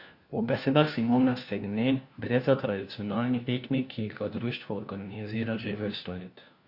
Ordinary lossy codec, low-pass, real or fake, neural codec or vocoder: Opus, 64 kbps; 5.4 kHz; fake; codec, 16 kHz, 1 kbps, FunCodec, trained on LibriTTS, 50 frames a second